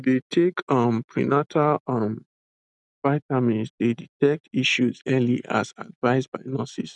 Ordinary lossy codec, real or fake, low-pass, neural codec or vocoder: none; real; 10.8 kHz; none